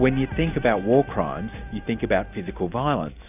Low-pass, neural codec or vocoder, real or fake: 3.6 kHz; none; real